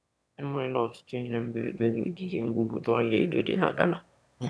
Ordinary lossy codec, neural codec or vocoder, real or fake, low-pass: none; autoencoder, 22.05 kHz, a latent of 192 numbers a frame, VITS, trained on one speaker; fake; none